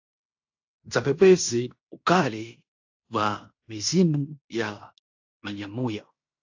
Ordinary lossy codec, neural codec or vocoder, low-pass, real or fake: AAC, 48 kbps; codec, 16 kHz in and 24 kHz out, 0.9 kbps, LongCat-Audio-Codec, fine tuned four codebook decoder; 7.2 kHz; fake